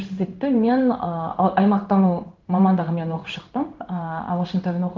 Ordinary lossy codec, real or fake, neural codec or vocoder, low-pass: Opus, 24 kbps; fake; codec, 16 kHz in and 24 kHz out, 1 kbps, XY-Tokenizer; 7.2 kHz